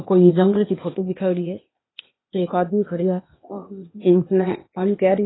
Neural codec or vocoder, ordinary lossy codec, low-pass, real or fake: codec, 16 kHz, 0.8 kbps, ZipCodec; AAC, 16 kbps; 7.2 kHz; fake